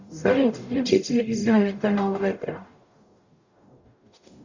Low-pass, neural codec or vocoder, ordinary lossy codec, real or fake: 7.2 kHz; codec, 44.1 kHz, 0.9 kbps, DAC; Opus, 64 kbps; fake